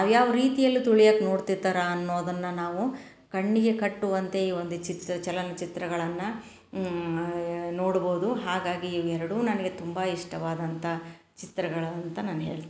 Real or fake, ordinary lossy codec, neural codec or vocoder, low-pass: real; none; none; none